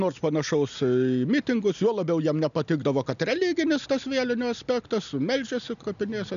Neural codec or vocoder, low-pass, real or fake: none; 7.2 kHz; real